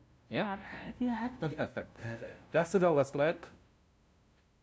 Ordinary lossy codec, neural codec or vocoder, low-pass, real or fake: none; codec, 16 kHz, 0.5 kbps, FunCodec, trained on LibriTTS, 25 frames a second; none; fake